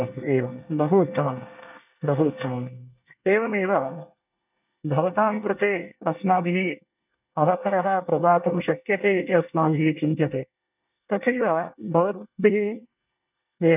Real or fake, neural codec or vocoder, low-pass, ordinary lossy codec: fake; codec, 24 kHz, 1 kbps, SNAC; 3.6 kHz; none